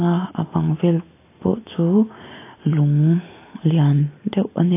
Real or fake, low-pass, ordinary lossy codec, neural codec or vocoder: real; 3.6 kHz; AAC, 24 kbps; none